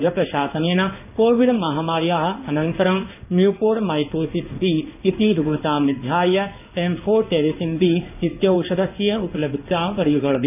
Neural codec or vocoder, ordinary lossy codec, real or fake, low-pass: codec, 16 kHz in and 24 kHz out, 1 kbps, XY-Tokenizer; none; fake; 3.6 kHz